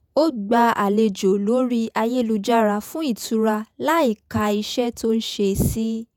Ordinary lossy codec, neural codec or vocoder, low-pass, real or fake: none; vocoder, 48 kHz, 128 mel bands, Vocos; 19.8 kHz; fake